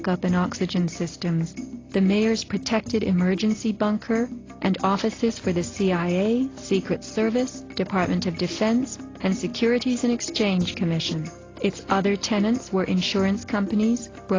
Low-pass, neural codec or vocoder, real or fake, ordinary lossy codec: 7.2 kHz; none; real; AAC, 32 kbps